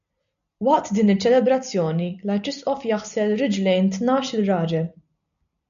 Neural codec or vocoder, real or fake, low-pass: none; real; 7.2 kHz